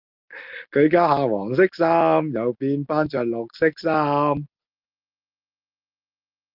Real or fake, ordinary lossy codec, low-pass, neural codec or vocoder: real; Opus, 32 kbps; 5.4 kHz; none